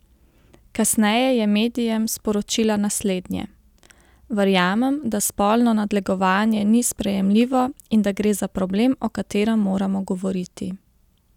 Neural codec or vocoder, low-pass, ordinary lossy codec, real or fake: none; 19.8 kHz; none; real